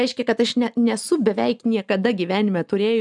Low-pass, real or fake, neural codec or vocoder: 10.8 kHz; real; none